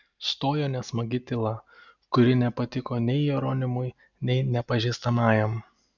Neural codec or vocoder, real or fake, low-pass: none; real; 7.2 kHz